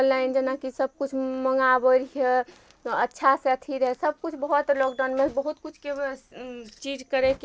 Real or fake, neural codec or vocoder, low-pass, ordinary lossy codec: real; none; none; none